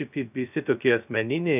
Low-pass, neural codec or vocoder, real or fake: 3.6 kHz; codec, 16 kHz, 0.2 kbps, FocalCodec; fake